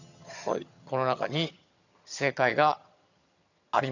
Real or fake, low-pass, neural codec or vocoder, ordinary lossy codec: fake; 7.2 kHz; vocoder, 22.05 kHz, 80 mel bands, HiFi-GAN; none